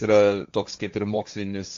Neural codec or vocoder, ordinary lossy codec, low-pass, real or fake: codec, 16 kHz, 1.1 kbps, Voila-Tokenizer; AAC, 64 kbps; 7.2 kHz; fake